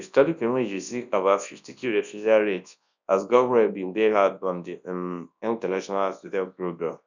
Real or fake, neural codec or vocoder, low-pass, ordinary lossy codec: fake; codec, 24 kHz, 0.9 kbps, WavTokenizer, large speech release; 7.2 kHz; none